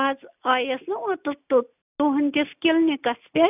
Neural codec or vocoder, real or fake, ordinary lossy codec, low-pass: none; real; none; 3.6 kHz